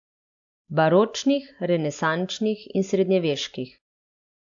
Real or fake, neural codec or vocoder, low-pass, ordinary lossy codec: real; none; 7.2 kHz; AAC, 64 kbps